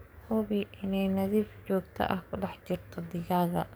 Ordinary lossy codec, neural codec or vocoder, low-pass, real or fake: none; codec, 44.1 kHz, 7.8 kbps, DAC; none; fake